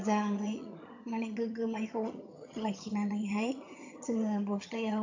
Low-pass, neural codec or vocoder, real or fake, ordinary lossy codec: 7.2 kHz; vocoder, 22.05 kHz, 80 mel bands, HiFi-GAN; fake; AAC, 48 kbps